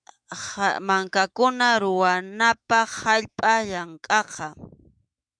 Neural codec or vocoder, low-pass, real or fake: autoencoder, 48 kHz, 128 numbers a frame, DAC-VAE, trained on Japanese speech; 9.9 kHz; fake